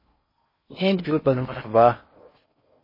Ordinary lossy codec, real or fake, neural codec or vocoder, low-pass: MP3, 24 kbps; fake; codec, 16 kHz in and 24 kHz out, 0.6 kbps, FocalCodec, streaming, 4096 codes; 5.4 kHz